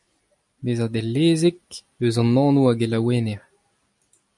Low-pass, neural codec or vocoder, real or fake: 10.8 kHz; none; real